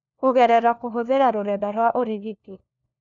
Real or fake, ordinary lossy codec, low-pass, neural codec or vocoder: fake; none; 7.2 kHz; codec, 16 kHz, 1 kbps, FunCodec, trained on LibriTTS, 50 frames a second